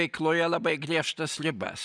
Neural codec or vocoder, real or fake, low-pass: none; real; 9.9 kHz